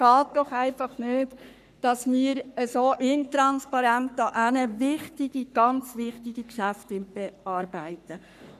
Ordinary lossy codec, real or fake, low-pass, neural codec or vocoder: none; fake; 14.4 kHz; codec, 44.1 kHz, 3.4 kbps, Pupu-Codec